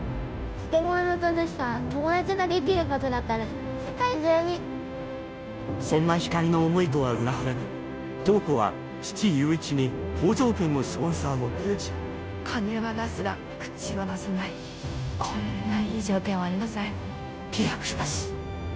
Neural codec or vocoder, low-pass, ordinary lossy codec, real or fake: codec, 16 kHz, 0.5 kbps, FunCodec, trained on Chinese and English, 25 frames a second; none; none; fake